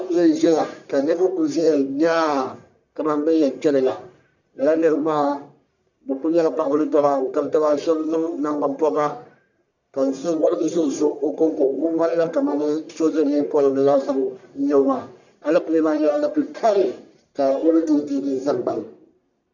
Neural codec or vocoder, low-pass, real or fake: codec, 44.1 kHz, 1.7 kbps, Pupu-Codec; 7.2 kHz; fake